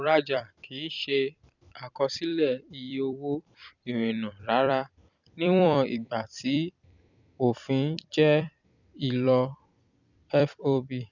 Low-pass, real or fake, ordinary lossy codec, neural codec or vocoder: 7.2 kHz; fake; none; vocoder, 44.1 kHz, 128 mel bands every 256 samples, BigVGAN v2